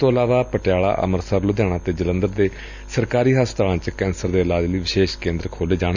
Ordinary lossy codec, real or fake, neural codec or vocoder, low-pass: none; real; none; 7.2 kHz